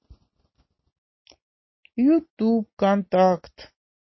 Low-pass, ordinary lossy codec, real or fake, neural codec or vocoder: 7.2 kHz; MP3, 24 kbps; real; none